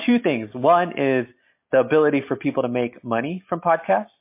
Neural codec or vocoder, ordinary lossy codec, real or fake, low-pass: none; MP3, 24 kbps; real; 3.6 kHz